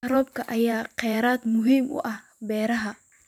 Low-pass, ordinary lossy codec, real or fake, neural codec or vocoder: 19.8 kHz; none; fake; vocoder, 44.1 kHz, 128 mel bands every 512 samples, BigVGAN v2